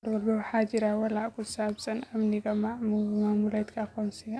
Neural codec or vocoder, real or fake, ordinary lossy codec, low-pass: none; real; none; none